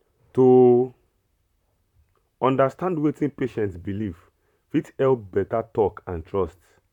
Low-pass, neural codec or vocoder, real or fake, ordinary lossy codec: 19.8 kHz; vocoder, 44.1 kHz, 128 mel bands, Pupu-Vocoder; fake; none